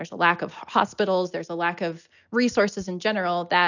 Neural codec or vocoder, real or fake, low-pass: none; real; 7.2 kHz